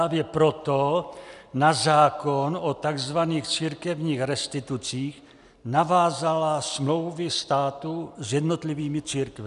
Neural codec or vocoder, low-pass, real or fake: none; 10.8 kHz; real